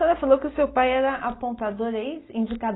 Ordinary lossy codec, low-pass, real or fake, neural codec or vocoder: AAC, 16 kbps; 7.2 kHz; real; none